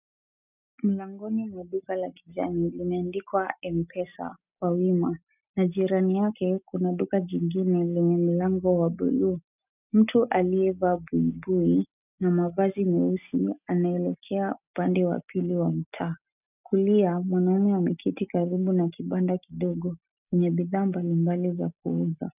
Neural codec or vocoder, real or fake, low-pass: none; real; 3.6 kHz